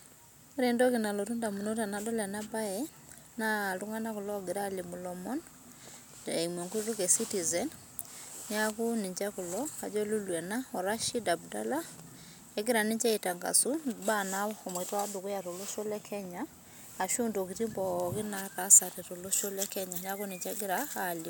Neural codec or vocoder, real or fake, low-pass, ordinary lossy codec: none; real; none; none